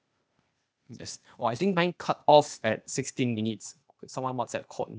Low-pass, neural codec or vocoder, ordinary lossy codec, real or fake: none; codec, 16 kHz, 0.8 kbps, ZipCodec; none; fake